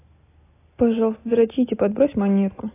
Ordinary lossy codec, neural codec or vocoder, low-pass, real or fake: AAC, 16 kbps; none; 3.6 kHz; real